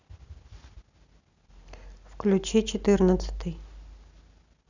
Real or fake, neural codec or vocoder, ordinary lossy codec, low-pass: real; none; none; 7.2 kHz